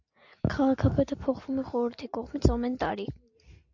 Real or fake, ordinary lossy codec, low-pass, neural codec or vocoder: real; AAC, 48 kbps; 7.2 kHz; none